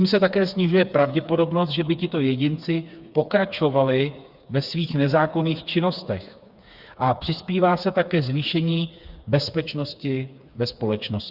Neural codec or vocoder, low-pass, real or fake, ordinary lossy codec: codec, 16 kHz, 4 kbps, FreqCodec, smaller model; 5.4 kHz; fake; Opus, 64 kbps